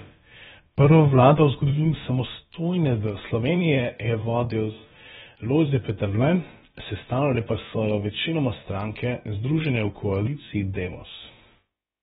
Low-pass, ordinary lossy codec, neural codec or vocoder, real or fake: 7.2 kHz; AAC, 16 kbps; codec, 16 kHz, about 1 kbps, DyCAST, with the encoder's durations; fake